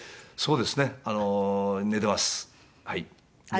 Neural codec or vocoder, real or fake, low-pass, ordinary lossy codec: none; real; none; none